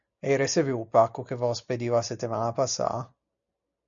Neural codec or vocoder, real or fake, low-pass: none; real; 7.2 kHz